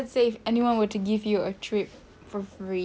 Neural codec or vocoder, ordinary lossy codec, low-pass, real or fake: none; none; none; real